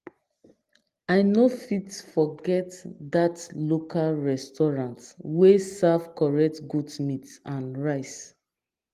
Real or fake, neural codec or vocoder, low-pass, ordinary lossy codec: real; none; 14.4 kHz; Opus, 24 kbps